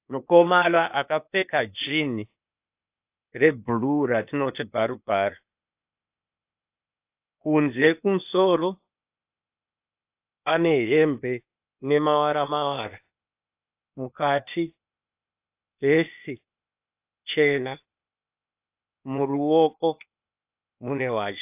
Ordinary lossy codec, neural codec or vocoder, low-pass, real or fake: AAC, 32 kbps; codec, 16 kHz, 0.8 kbps, ZipCodec; 3.6 kHz; fake